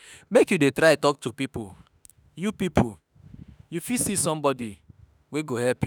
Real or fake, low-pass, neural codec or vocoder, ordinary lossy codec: fake; none; autoencoder, 48 kHz, 32 numbers a frame, DAC-VAE, trained on Japanese speech; none